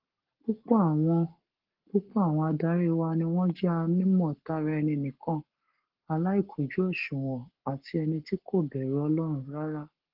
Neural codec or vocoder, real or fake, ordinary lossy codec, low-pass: codec, 44.1 kHz, 7.8 kbps, Pupu-Codec; fake; Opus, 16 kbps; 5.4 kHz